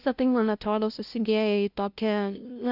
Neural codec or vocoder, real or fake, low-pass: codec, 16 kHz, 0.5 kbps, FunCodec, trained on LibriTTS, 25 frames a second; fake; 5.4 kHz